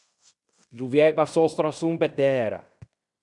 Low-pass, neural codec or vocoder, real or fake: 10.8 kHz; codec, 16 kHz in and 24 kHz out, 0.9 kbps, LongCat-Audio-Codec, fine tuned four codebook decoder; fake